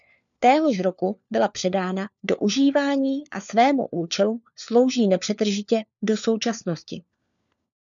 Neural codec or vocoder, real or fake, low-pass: codec, 16 kHz, 4 kbps, FunCodec, trained on LibriTTS, 50 frames a second; fake; 7.2 kHz